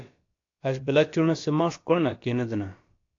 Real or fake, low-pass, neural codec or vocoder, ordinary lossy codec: fake; 7.2 kHz; codec, 16 kHz, about 1 kbps, DyCAST, with the encoder's durations; AAC, 48 kbps